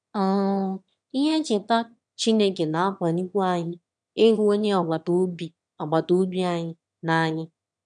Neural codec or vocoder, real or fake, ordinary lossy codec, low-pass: autoencoder, 22.05 kHz, a latent of 192 numbers a frame, VITS, trained on one speaker; fake; MP3, 96 kbps; 9.9 kHz